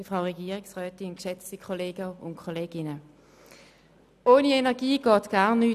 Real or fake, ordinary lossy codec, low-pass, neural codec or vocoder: real; none; 14.4 kHz; none